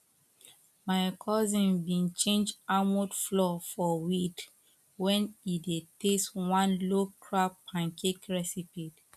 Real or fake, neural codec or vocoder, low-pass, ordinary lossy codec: real; none; 14.4 kHz; none